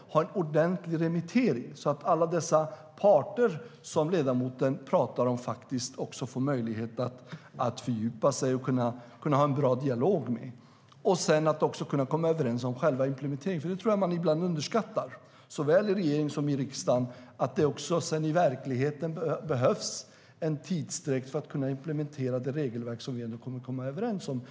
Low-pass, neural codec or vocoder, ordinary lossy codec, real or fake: none; none; none; real